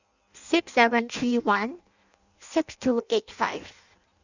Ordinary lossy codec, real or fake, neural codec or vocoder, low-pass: none; fake; codec, 16 kHz in and 24 kHz out, 0.6 kbps, FireRedTTS-2 codec; 7.2 kHz